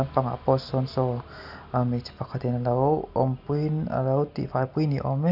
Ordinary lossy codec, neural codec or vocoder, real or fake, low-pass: none; none; real; 5.4 kHz